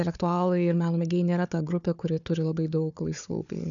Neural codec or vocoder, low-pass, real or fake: codec, 16 kHz, 4 kbps, FunCodec, trained on Chinese and English, 50 frames a second; 7.2 kHz; fake